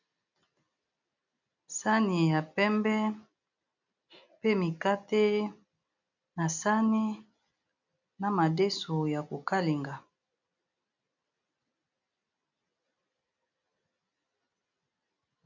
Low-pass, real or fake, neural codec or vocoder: 7.2 kHz; real; none